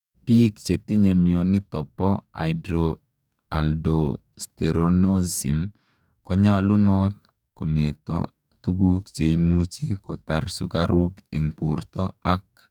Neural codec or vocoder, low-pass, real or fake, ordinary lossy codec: codec, 44.1 kHz, 2.6 kbps, DAC; 19.8 kHz; fake; none